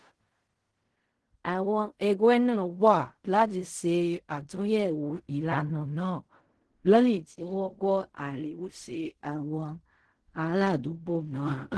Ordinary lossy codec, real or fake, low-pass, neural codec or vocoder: Opus, 16 kbps; fake; 10.8 kHz; codec, 16 kHz in and 24 kHz out, 0.4 kbps, LongCat-Audio-Codec, fine tuned four codebook decoder